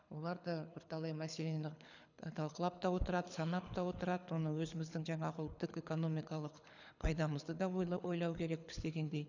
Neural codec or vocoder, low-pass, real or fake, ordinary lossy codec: codec, 24 kHz, 6 kbps, HILCodec; 7.2 kHz; fake; none